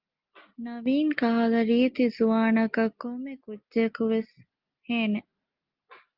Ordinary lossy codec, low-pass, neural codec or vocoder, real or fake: Opus, 32 kbps; 5.4 kHz; none; real